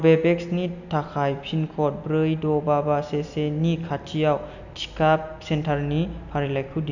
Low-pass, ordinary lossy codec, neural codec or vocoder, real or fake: 7.2 kHz; none; none; real